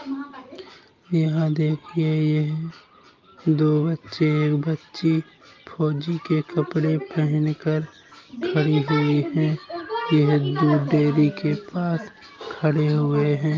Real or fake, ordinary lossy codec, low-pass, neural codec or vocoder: real; Opus, 24 kbps; 7.2 kHz; none